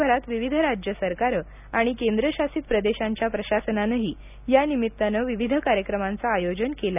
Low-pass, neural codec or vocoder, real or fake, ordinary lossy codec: 3.6 kHz; none; real; none